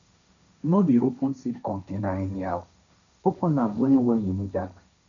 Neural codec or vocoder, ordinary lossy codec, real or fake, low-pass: codec, 16 kHz, 1.1 kbps, Voila-Tokenizer; MP3, 96 kbps; fake; 7.2 kHz